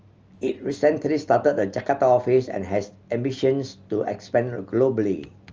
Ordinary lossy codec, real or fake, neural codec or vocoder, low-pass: Opus, 24 kbps; real; none; 7.2 kHz